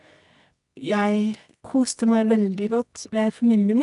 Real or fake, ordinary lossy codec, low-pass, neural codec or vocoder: fake; none; 10.8 kHz; codec, 24 kHz, 0.9 kbps, WavTokenizer, medium music audio release